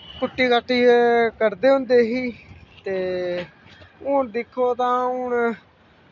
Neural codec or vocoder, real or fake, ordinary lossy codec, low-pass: none; real; none; 7.2 kHz